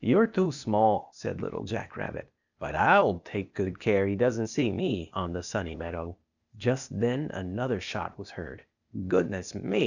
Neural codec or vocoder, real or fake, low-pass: codec, 16 kHz, 0.8 kbps, ZipCodec; fake; 7.2 kHz